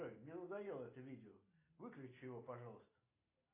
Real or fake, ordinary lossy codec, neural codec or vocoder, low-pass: real; AAC, 24 kbps; none; 3.6 kHz